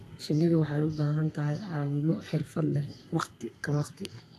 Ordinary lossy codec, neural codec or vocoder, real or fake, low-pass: AAC, 64 kbps; codec, 32 kHz, 1.9 kbps, SNAC; fake; 14.4 kHz